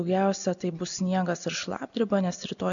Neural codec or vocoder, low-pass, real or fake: none; 7.2 kHz; real